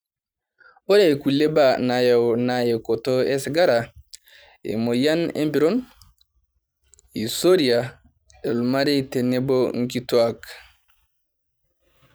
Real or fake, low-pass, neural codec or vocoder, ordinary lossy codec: fake; none; vocoder, 44.1 kHz, 128 mel bands every 512 samples, BigVGAN v2; none